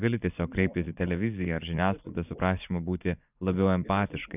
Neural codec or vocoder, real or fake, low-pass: vocoder, 24 kHz, 100 mel bands, Vocos; fake; 3.6 kHz